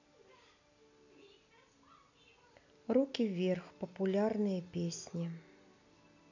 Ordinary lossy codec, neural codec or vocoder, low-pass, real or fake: none; none; 7.2 kHz; real